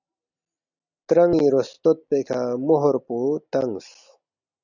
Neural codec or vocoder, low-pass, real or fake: none; 7.2 kHz; real